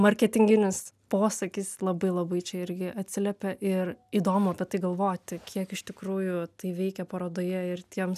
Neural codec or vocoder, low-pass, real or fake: none; 14.4 kHz; real